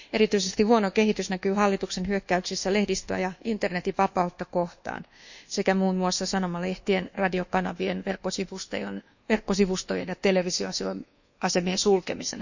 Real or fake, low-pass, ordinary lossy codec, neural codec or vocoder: fake; 7.2 kHz; none; codec, 24 kHz, 1.2 kbps, DualCodec